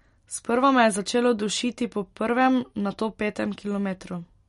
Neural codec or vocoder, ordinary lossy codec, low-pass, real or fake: none; MP3, 48 kbps; 19.8 kHz; real